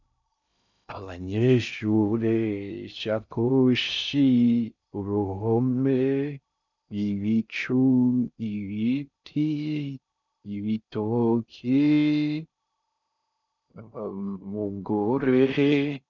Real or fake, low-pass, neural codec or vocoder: fake; 7.2 kHz; codec, 16 kHz in and 24 kHz out, 0.6 kbps, FocalCodec, streaming, 2048 codes